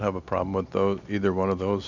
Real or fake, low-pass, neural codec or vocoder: real; 7.2 kHz; none